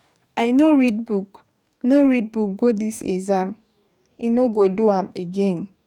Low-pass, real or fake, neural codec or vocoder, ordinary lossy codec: 19.8 kHz; fake; codec, 44.1 kHz, 2.6 kbps, DAC; none